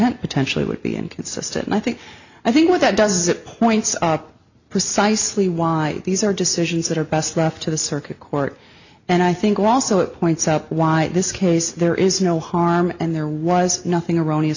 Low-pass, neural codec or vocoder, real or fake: 7.2 kHz; none; real